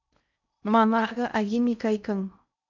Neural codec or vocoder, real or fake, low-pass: codec, 16 kHz in and 24 kHz out, 0.6 kbps, FocalCodec, streaming, 2048 codes; fake; 7.2 kHz